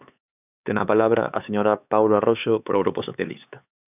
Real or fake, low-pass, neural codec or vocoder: fake; 3.6 kHz; codec, 16 kHz, 4 kbps, X-Codec, HuBERT features, trained on LibriSpeech